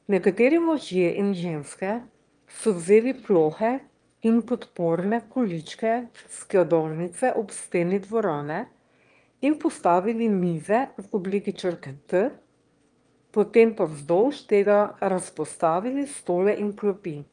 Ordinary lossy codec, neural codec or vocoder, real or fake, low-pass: Opus, 32 kbps; autoencoder, 22.05 kHz, a latent of 192 numbers a frame, VITS, trained on one speaker; fake; 9.9 kHz